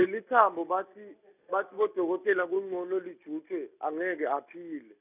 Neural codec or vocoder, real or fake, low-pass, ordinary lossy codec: none; real; 3.6 kHz; none